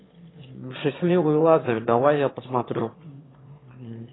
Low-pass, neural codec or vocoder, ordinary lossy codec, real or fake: 7.2 kHz; autoencoder, 22.05 kHz, a latent of 192 numbers a frame, VITS, trained on one speaker; AAC, 16 kbps; fake